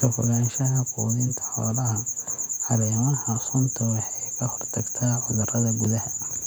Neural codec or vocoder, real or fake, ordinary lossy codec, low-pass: vocoder, 48 kHz, 128 mel bands, Vocos; fake; none; 19.8 kHz